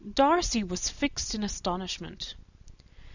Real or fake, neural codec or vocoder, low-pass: real; none; 7.2 kHz